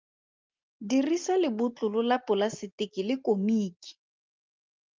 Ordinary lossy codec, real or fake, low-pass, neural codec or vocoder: Opus, 24 kbps; real; 7.2 kHz; none